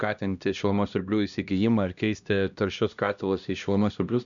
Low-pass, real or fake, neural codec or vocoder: 7.2 kHz; fake; codec, 16 kHz, 1 kbps, X-Codec, HuBERT features, trained on LibriSpeech